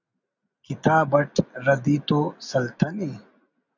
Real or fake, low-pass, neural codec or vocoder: real; 7.2 kHz; none